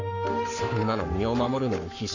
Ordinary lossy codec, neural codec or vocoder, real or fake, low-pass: none; codec, 44.1 kHz, 7.8 kbps, Pupu-Codec; fake; 7.2 kHz